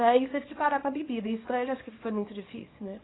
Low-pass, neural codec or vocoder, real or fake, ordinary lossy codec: 7.2 kHz; codec, 24 kHz, 0.9 kbps, WavTokenizer, small release; fake; AAC, 16 kbps